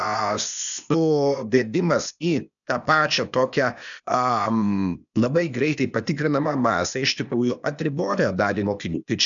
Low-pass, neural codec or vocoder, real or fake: 7.2 kHz; codec, 16 kHz, 0.8 kbps, ZipCodec; fake